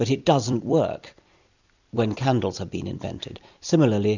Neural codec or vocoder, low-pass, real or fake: vocoder, 44.1 kHz, 128 mel bands every 256 samples, BigVGAN v2; 7.2 kHz; fake